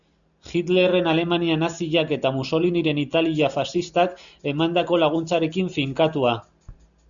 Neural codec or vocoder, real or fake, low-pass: none; real; 7.2 kHz